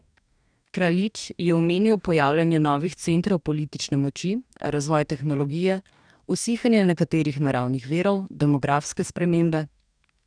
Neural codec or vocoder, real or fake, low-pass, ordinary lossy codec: codec, 44.1 kHz, 2.6 kbps, DAC; fake; 9.9 kHz; none